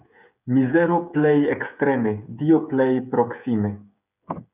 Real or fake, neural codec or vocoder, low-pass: fake; codec, 16 kHz, 8 kbps, FreqCodec, smaller model; 3.6 kHz